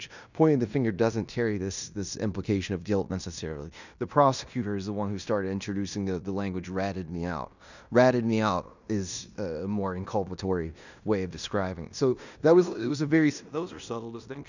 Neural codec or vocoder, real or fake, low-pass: codec, 16 kHz in and 24 kHz out, 0.9 kbps, LongCat-Audio-Codec, fine tuned four codebook decoder; fake; 7.2 kHz